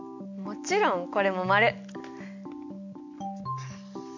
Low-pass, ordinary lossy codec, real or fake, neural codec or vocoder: 7.2 kHz; MP3, 64 kbps; real; none